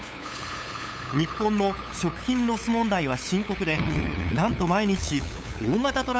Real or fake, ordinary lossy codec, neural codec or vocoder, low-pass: fake; none; codec, 16 kHz, 8 kbps, FunCodec, trained on LibriTTS, 25 frames a second; none